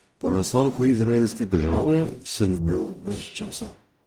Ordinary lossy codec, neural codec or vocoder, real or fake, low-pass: Opus, 32 kbps; codec, 44.1 kHz, 0.9 kbps, DAC; fake; 19.8 kHz